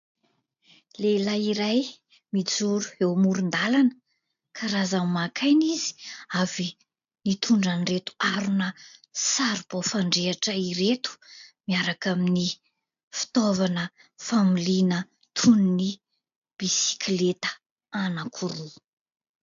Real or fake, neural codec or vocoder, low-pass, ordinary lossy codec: real; none; 7.2 kHz; AAC, 96 kbps